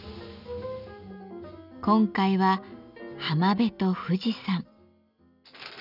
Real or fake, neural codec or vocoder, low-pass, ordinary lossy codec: real; none; 5.4 kHz; none